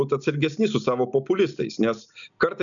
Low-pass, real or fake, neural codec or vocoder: 7.2 kHz; real; none